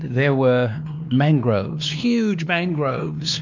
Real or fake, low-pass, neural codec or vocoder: fake; 7.2 kHz; codec, 16 kHz, 2 kbps, X-Codec, WavLM features, trained on Multilingual LibriSpeech